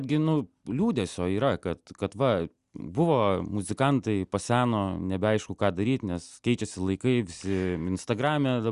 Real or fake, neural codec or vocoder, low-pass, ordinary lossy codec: real; none; 10.8 kHz; Opus, 64 kbps